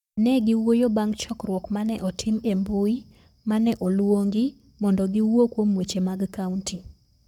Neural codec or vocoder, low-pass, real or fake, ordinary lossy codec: codec, 44.1 kHz, 7.8 kbps, Pupu-Codec; 19.8 kHz; fake; none